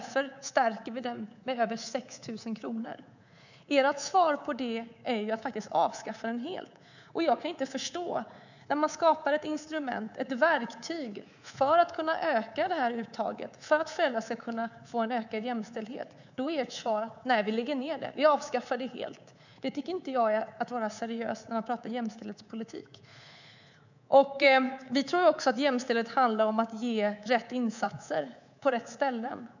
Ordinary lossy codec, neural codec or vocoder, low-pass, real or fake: none; codec, 24 kHz, 3.1 kbps, DualCodec; 7.2 kHz; fake